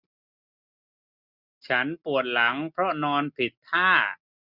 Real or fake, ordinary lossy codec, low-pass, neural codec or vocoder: real; none; 5.4 kHz; none